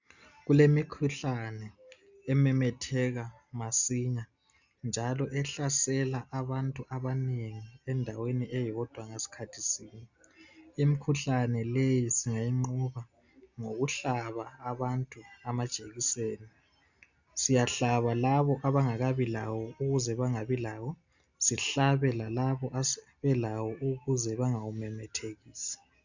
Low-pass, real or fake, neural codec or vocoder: 7.2 kHz; real; none